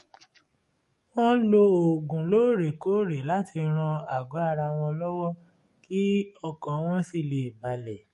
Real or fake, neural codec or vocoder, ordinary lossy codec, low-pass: fake; codec, 44.1 kHz, 7.8 kbps, DAC; MP3, 48 kbps; 14.4 kHz